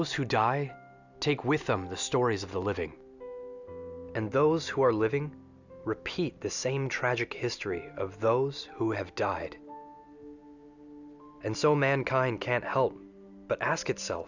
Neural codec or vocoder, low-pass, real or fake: none; 7.2 kHz; real